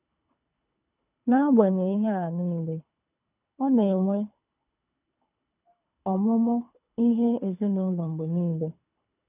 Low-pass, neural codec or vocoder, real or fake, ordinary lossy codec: 3.6 kHz; codec, 24 kHz, 3 kbps, HILCodec; fake; none